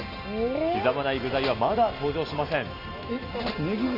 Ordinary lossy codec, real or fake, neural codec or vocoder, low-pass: AAC, 48 kbps; real; none; 5.4 kHz